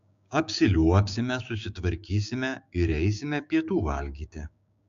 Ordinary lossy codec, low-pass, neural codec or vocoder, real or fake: AAC, 64 kbps; 7.2 kHz; codec, 16 kHz, 6 kbps, DAC; fake